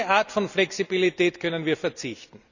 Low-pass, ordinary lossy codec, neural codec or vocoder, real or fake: 7.2 kHz; none; none; real